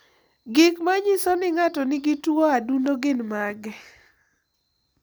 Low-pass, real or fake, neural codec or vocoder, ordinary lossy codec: none; real; none; none